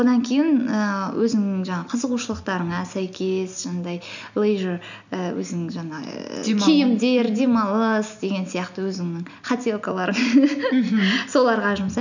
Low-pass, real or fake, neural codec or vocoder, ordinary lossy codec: 7.2 kHz; real; none; none